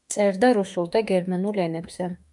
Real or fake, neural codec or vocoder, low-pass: fake; autoencoder, 48 kHz, 32 numbers a frame, DAC-VAE, trained on Japanese speech; 10.8 kHz